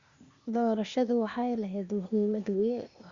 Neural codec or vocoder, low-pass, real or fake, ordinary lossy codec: codec, 16 kHz, 0.8 kbps, ZipCodec; 7.2 kHz; fake; Opus, 64 kbps